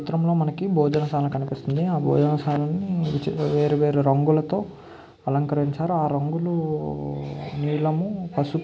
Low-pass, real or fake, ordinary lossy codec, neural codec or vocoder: none; real; none; none